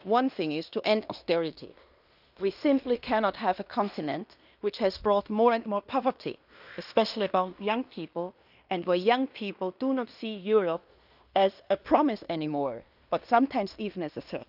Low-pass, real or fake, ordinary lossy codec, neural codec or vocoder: 5.4 kHz; fake; none; codec, 16 kHz in and 24 kHz out, 0.9 kbps, LongCat-Audio-Codec, fine tuned four codebook decoder